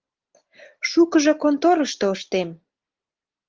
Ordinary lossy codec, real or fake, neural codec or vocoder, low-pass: Opus, 16 kbps; real; none; 7.2 kHz